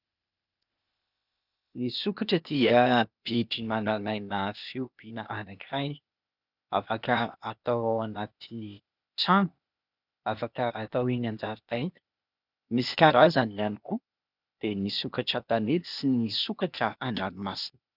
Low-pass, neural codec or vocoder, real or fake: 5.4 kHz; codec, 16 kHz, 0.8 kbps, ZipCodec; fake